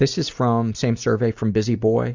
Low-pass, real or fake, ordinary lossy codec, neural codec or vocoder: 7.2 kHz; real; Opus, 64 kbps; none